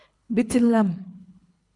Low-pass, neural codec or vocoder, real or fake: 10.8 kHz; codec, 24 kHz, 3 kbps, HILCodec; fake